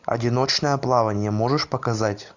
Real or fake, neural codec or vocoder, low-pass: real; none; 7.2 kHz